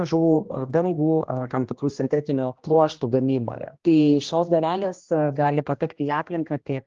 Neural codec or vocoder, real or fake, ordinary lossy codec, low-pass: codec, 16 kHz, 1 kbps, X-Codec, HuBERT features, trained on general audio; fake; Opus, 32 kbps; 7.2 kHz